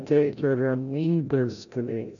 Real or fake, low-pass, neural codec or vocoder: fake; 7.2 kHz; codec, 16 kHz, 0.5 kbps, FreqCodec, larger model